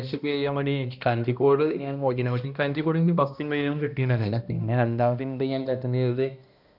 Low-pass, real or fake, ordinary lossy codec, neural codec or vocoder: 5.4 kHz; fake; none; codec, 16 kHz, 1 kbps, X-Codec, HuBERT features, trained on balanced general audio